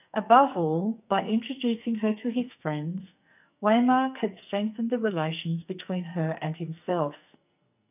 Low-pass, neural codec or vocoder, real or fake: 3.6 kHz; codec, 44.1 kHz, 2.6 kbps, SNAC; fake